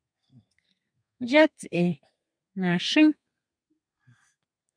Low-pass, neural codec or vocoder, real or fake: 9.9 kHz; codec, 32 kHz, 1.9 kbps, SNAC; fake